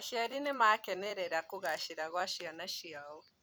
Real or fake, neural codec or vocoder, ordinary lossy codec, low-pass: fake; vocoder, 44.1 kHz, 128 mel bands every 256 samples, BigVGAN v2; none; none